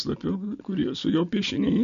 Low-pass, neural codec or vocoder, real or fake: 7.2 kHz; none; real